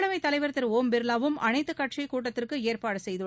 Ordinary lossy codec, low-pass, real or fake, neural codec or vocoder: none; none; real; none